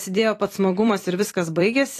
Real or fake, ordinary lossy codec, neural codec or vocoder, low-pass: fake; AAC, 48 kbps; vocoder, 44.1 kHz, 128 mel bands every 256 samples, BigVGAN v2; 14.4 kHz